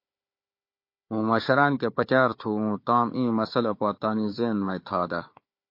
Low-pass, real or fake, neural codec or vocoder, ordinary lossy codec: 5.4 kHz; fake; codec, 16 kHz, 4 kbps, FunCodec, trained on Chinese and English, 50 frames a second; MP3, 32 kbps